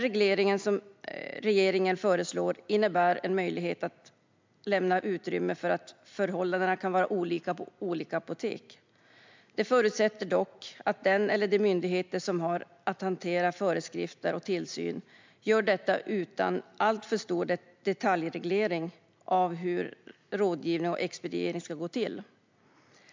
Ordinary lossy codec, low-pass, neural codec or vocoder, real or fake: MP3, 64 kbps; 7.2 kHz; none; real